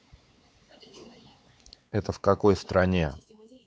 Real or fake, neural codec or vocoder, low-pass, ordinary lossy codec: fake; codec, 16 kHz, 4 kbps, X-Codec, WavLM features, trained on Multilingual LibriSpeech; none; none